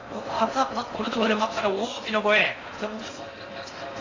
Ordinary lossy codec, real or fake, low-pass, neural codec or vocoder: AAC, 32 kbps; fake; 7.2 kHz; codec, 16 kHz in and 24 kHz out, 0.6 kbps, FocalCodec, streaming, 4096 codes